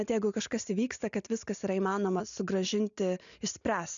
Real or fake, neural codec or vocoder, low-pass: real; none; 7.2 kHz